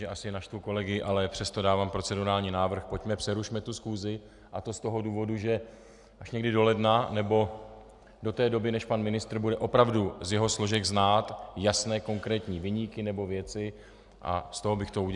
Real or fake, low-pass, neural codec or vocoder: real; 10.8 kHz; none